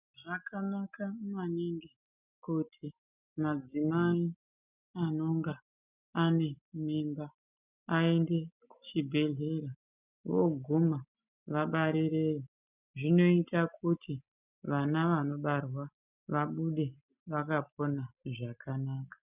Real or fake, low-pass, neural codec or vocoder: real; 3.6 kHz; none